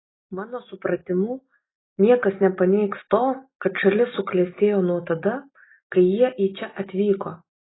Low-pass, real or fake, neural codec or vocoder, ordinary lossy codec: 7.2 kHz; real; none; AAC, 16 kbps